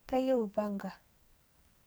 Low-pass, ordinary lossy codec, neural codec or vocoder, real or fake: none; none; codec, 44.1 kHz, 2.6 kbps, SNAC; fake